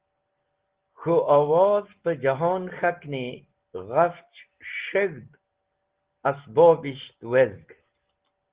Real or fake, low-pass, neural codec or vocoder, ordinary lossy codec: real; 3.6 kHz; none; Opus, 16 kbps